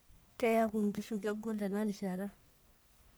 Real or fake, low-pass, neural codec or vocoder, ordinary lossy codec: fake; none; codec, 44.1 kHz, 1.7 kbps, Pupu-Codec; none